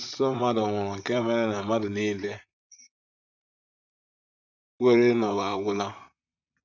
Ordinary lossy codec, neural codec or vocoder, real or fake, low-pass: none; vocoder, 44.1 kHz, 128 mel bands, Pupu-Vocoder; fake; 7.2 kHz